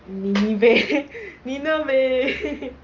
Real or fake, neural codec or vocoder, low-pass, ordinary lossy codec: real; none; 7.2 kHz; Opus, 24 kbps